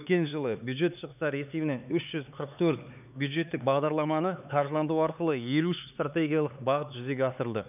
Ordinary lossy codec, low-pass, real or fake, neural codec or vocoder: none; 3.6 kHz; fake; codec, 16 kHz, 4 kbps, X-Codec, HuBERT features, trained on LibriSpeech